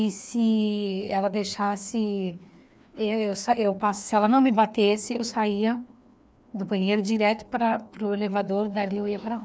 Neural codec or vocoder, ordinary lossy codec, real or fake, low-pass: codec, 16 kHz, 2 kbps, FreqCodec, larger model; none; fake; none